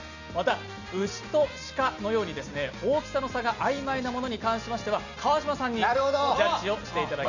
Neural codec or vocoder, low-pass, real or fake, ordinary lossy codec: none; 7.2 kHz; real; none